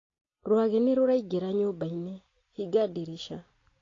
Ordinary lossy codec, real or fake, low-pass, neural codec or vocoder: AAC, 32 kbps; real; 7.2 kHz; none